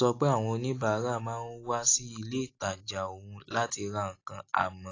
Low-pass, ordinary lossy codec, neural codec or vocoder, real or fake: 7.2 kHz; AAC, 32 kbps; none; real